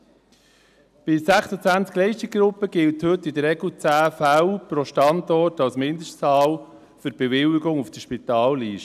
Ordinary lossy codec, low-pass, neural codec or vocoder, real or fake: none; 14.4 kHz; none; real